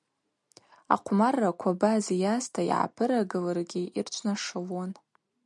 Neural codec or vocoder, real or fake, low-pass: none; real; 10.8 kHz